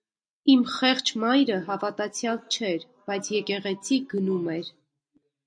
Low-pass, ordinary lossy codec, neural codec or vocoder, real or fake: 9.9 kHz; MP3, 48 kbps; none; real